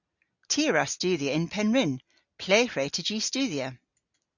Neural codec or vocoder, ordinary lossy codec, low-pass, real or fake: none; Opus, 64 kbps; 7.2 kHz; real